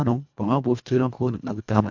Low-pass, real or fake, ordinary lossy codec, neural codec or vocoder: 7.2 kHz; fake; MP3, 64 kbps; codec, 24 kHz, 1.5 kbps, HILCodec